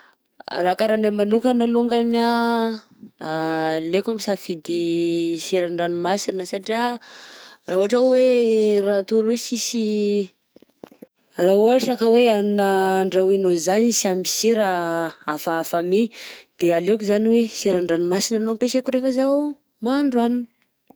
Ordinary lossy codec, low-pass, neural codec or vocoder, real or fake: none; none; codec, 44.1 kHz, 2.6 kbps, SNAC; fake